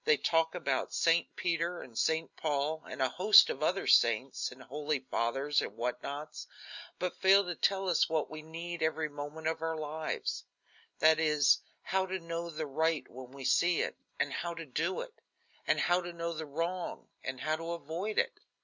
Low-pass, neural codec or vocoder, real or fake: 7.2 kHz; none; real